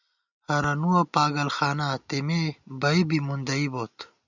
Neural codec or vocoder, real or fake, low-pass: none; real; 7.2 kHz